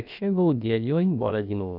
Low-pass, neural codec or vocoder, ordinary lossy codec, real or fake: 5.4 kHz; codec, 16 kHz, about 1 kbps, DyCAST, with the encoder's durations; none; fake